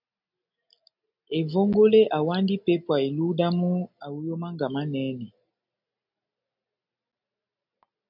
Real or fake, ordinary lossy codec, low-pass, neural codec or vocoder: real; MP3, 48 kbps; 5.4 kHz; none